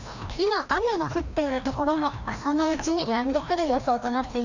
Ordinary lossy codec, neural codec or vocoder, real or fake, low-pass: none; codec, 16 kHz, 1 kbps, FreqCodec, larger model; fake; 7.2 kHz